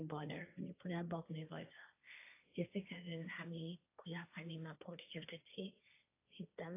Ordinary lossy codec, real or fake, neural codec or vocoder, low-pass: AAC, 24 kbps; fake; codec, 16 kHz, 1.1 kbps, Voila-Tokenizer; 3.6 kHz